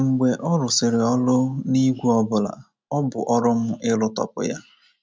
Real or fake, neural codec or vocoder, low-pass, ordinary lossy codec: real; none; none; none